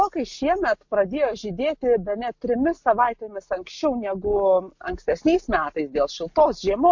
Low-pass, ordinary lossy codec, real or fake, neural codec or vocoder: 7.2 kHz; MP3, 48 kbps; real; none